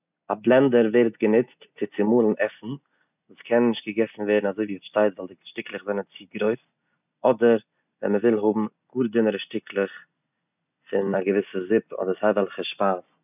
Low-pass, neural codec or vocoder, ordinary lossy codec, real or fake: 3.6 kHz; none; none; real